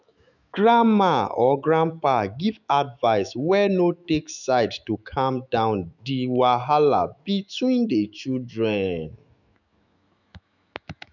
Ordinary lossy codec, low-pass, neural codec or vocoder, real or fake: none; 7.2 kHz; autoencoder, 48 kHz, 128 numbers a frame, DAC-VAE, trained on Japanese speech; fake